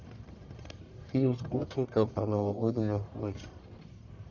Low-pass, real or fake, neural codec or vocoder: 7.2 kHz; fake; codec, 44.1 kHz, 1.7 kbps, Pupu-Codec